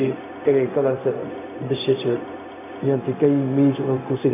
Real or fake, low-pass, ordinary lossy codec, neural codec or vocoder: fake; 3.6 kHz; none; codec, 16 kHz, 0.4 kbps, LongCat-Audio-Codec